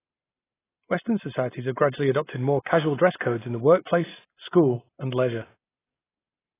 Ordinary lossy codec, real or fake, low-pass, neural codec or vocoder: AAC, 16 kbps; real; 3.6 kHz; none